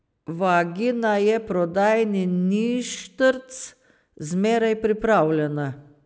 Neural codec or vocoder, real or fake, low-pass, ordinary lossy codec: none; real; none; none